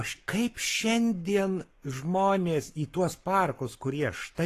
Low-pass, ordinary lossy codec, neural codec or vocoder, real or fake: 14.4 kHz; AAC, 48 kbps; codec, 44.1 kHz, 7.8 kbps, Pupu-Codec; fake